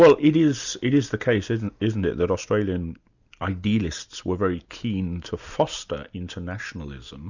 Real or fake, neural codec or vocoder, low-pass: real; none; 7.2 kHz